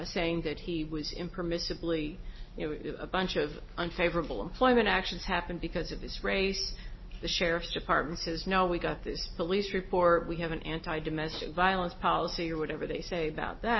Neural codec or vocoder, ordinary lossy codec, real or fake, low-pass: none; MP3, 24 kbps; real; 7.2 kHz